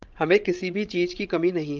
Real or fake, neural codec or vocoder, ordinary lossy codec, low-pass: fake; codec, 16 kHz, 16 kbps, FreqCodec, larger model; Opus, 32 kbps; 7.2 kHz